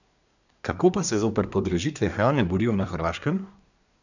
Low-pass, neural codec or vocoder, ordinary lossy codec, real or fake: 7.2 kHz; codec, 24 kHz, 1 kbps, SNAC; none; fake